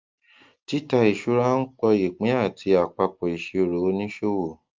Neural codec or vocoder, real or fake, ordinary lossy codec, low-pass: none; real; Opus, 32 kbps; 7.2 kHz